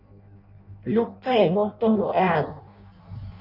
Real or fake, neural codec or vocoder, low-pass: fake; codec, 16 kHz in and 24 kHz out, 0.6 kbps, FireRedTTS-2 codec; 5.4 kHz